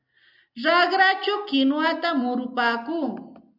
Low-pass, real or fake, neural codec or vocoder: 5.4 kHz; real; none